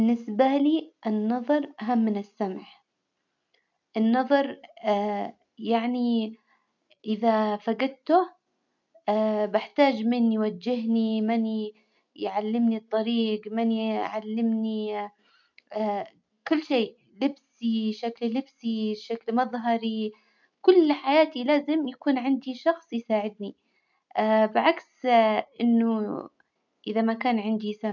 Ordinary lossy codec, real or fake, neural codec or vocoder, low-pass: MP3, 64 kbps; real; none; 7.2 kHz